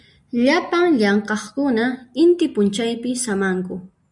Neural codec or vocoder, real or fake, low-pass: none; real; 10.8 kHz